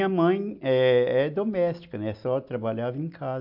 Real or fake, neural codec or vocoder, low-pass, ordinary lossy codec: real; none; 5.4 kHz; AAC, 48 kbps